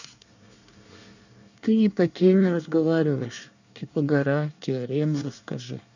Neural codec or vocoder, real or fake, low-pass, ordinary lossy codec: codec, 24 kHz, 1 kbps, SNAC; fake; 7.2 kHz; none